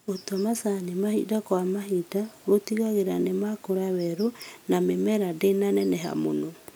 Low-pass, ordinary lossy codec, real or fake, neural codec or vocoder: none; none; real; none